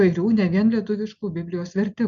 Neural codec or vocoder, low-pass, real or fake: none; 7.2 kHz; real